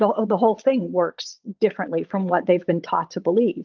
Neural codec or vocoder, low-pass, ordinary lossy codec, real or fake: vocoder, 22.05 kHz, 80 mel bands, Vocos; 7.2 kHz; Opus, 32 kbps; fake